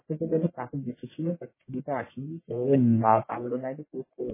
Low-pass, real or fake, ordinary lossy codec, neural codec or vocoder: 3.6 kHz; fake; MP3, 16 kbps; codec, 44.1 kHz, 1.7 kbps, Pupu-Codec